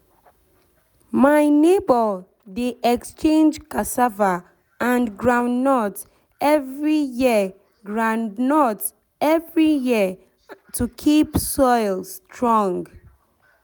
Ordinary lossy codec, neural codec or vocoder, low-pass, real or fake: none; none; none; real